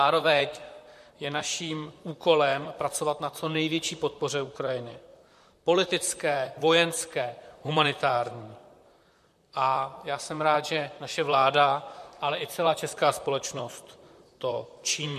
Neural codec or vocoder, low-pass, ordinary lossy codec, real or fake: vocoder, 44.1 kHz, 128 mel bands, Pupu-Vocoder; 14.4 kHz; MP3, 64 kbps; fake